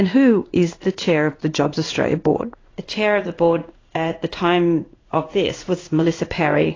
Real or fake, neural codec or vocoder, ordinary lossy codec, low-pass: fake; codec, 16 kHz in and 24 kHz out, 1 kbps, XY-Tokenizer; AAC, 32 kbps; 7.2 kHz